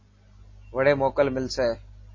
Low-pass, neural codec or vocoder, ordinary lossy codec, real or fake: 7.2 kHz; none; MP3, 32 kbps; real